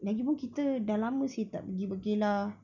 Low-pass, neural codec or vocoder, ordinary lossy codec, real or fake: 7.2 kHz; none; none; real